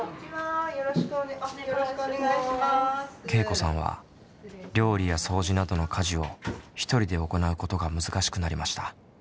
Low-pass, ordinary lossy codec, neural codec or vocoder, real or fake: none; none; none; real